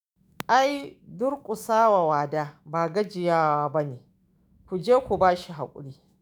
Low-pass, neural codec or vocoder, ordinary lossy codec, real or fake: none; autoencoder, 48 kHz, 128 numbers a frame, DAC-VAE, trained on Japanese speech; none; fake